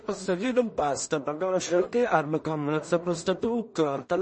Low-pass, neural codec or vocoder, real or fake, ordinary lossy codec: 10.8 kHz; codec, 16 kHz in and 24 kHz out, 0.4 kbps, LongCat-Audio-Codec, two codebook decoder; fake; MP3, 32 kbps